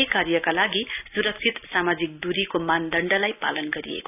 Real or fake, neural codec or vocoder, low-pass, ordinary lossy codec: real; none; 3.6 kHz; none